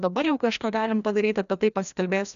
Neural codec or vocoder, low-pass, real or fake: codec, 16 kHz, 1 kbps, FreqCodec, larger model; 7.2 kHz; fake